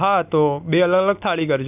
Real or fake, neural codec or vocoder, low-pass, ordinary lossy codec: real; none; 3.6 kHz; none